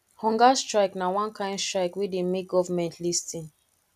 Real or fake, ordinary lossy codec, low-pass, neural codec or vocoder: real; none; 14.4 kHz; none